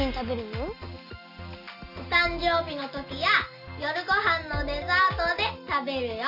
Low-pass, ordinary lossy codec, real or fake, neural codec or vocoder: 5.4 kHz; none; real; none